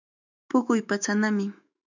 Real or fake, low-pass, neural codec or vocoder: fake; 7.2 kHz; autoencoder, 48 kHz, 128 numbers a frame, DAC-VAE, trained on Japanese speech